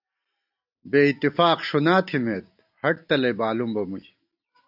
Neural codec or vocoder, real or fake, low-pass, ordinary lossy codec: none; real; 5.4 kHz; AAC, 48 kbps